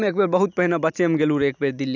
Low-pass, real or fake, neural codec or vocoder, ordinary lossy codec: 7.2 kHz; real; none; none